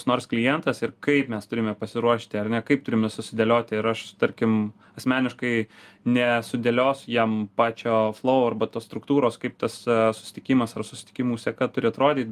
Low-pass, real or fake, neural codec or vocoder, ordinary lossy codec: 14.4 kHz; real; none; Opus, 32 kbps